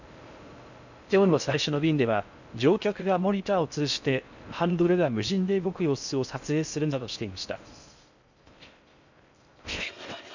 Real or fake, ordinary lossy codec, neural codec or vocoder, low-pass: fake; none; codec, 16 kHz in and 24 kHz out, 0.6 kbps, FocalCodec, streaming, 4096 codes; 7.2 kHz